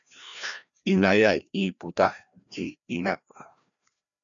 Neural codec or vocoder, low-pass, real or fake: codec, 16 kHz, 1 kbps, FreqCodec, larger model; 7.2 kHz; fake